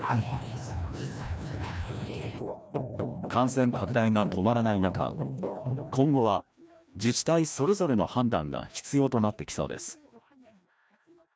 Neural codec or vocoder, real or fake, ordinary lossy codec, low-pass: codec, 16 kHz, 1 kbps, FreqCodec, larger model; fake; none; none